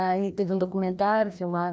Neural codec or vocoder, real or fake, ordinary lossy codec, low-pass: codec, 16 kHz, 1 kbps, FreqCodec, larger model; fake; none; none